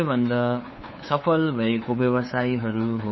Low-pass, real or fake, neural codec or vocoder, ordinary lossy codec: 7.2 kHz; fake; codec, 16 kHz, 8 kbps, FunCodec, trained on LibriTTS, 25 frames a second; MP3, 24 kbps